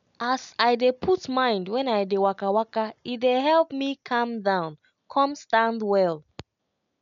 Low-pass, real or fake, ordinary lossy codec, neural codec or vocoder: 7.2 kHz; real; none; none